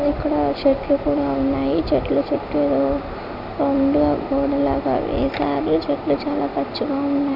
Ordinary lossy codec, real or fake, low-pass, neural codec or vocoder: none; real; 5.4 kHz; none